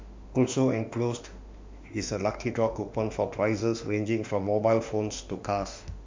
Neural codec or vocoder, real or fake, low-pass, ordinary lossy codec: autoencoder, 48 kHz, 32 numbers a frame, DAC-VAE, trained on Japanese speech; fake; 7.2 kHz; MP3, 64 kbps